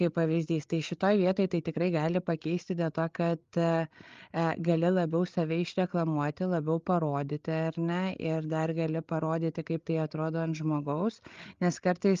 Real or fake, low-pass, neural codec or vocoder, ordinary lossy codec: fake; 7.2 kHz; codec, 16 kHz, 8 kbps, FreqCodec, larger model; Opus, 32 kbps